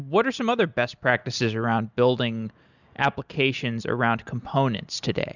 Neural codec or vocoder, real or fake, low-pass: none; real; 7.2 kHz